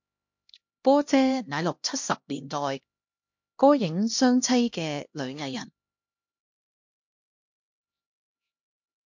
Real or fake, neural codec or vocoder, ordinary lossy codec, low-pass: fake; codec, 16 kHz, 1 kbps, X-Codec, HuBERT features, trained on LibriSpeech; MP3, 48 kbps; 7.2 kHz